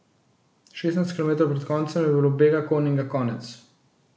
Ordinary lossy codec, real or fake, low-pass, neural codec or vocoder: none; real; none; none